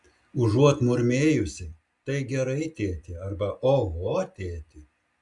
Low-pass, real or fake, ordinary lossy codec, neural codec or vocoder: 10.8 kHz; real; Opus, 64 kbps; none